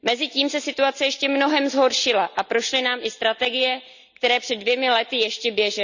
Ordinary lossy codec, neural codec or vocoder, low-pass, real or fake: none; none; 7.2 kHz; real